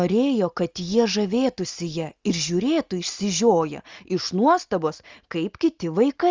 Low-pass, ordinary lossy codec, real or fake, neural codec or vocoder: 7.2 kHz; Opus, 32 kbps; real; none